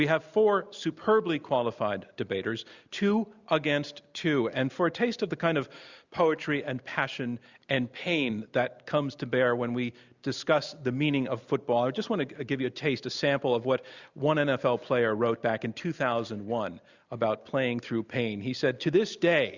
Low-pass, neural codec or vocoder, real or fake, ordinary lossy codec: 7.2 kHz; none; real; Opus, 64 kbps